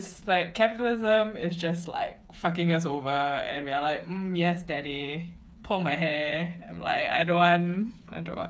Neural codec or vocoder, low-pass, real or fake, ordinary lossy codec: codec, 16 kHz, 4 kbps, FreqCodec, smaller model; none; fake; none